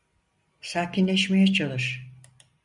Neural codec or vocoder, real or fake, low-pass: none; real; 10.8 kHz